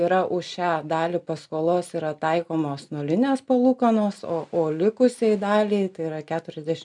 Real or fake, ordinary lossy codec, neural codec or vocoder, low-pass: real; MP3, 96 kbps; none; 10.8 kHz